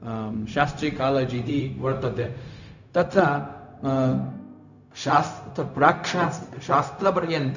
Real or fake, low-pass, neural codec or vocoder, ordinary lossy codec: fake; 7.2 kHz; codec, 16 kHz, 0.4 kbps, LongCat-Audio-Codec; none